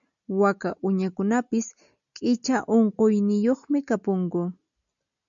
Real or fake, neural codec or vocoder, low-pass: real; none; 7.2 kHz